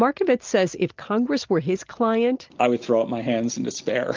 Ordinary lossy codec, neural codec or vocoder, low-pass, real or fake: Opus, 32 kbps; none; 7.2 kHz; real